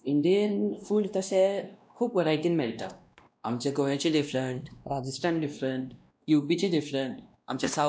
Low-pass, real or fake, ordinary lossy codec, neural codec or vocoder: none; fake; none; codec, 16 kHz, 1 kbps, X-Codec, WavLM features, trained on Multilingual LibriSpeech